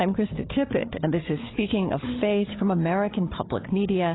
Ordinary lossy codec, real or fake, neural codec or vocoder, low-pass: AAC, 16 kbps; fake; codec, 16 kHz, 4 kbps, FunCodec, trained on Chinese and English, 50 frames a second; 7.2 kHz